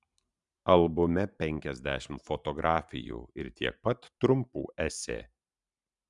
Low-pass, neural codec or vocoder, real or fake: 10.8 kHz; vocoder, 44.1 kHz, 128 mel bands every 512 samples, BigVGAN v2; fake